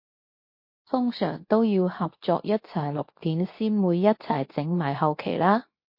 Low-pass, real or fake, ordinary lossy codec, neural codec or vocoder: 5.4 kHz; fake; MP3, 32 kbps; codec, 24 kHz, 0.9 kbps, WavTokenizer, medium speech release version 2